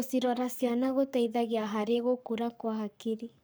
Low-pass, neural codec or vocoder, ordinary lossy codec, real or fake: none; codec, 44.1 kHz, 7.8 kbps, Pupu-Codec; none; fake